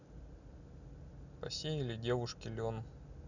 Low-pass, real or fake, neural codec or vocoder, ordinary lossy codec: 7.2 kHz; real; none; none